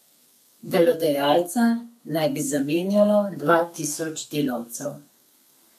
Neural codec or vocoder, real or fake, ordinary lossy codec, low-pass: codec, 32 kHz, 1.9 kbps, SNAC; fake; none; 14.4 kHz